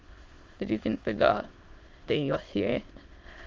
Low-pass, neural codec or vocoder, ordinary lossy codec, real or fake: 7.2 kHz; autoencoder, 22.05 kHz, a latent of 192 numbers a frame, VITS, trained on many speakers; Opus, 32 kbps; fake